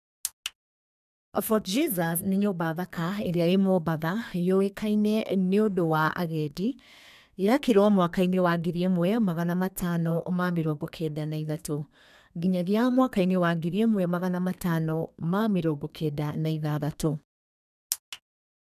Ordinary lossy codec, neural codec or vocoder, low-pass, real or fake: none; codec, 32 kHz, 1.9 kbps, SNAC; 14.4 kHz; fake